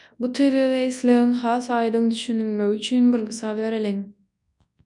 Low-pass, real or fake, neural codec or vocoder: 10.8 kHz; fake; codec, 24 kHz, 0.9 kbps, WavTokenizer, large speech release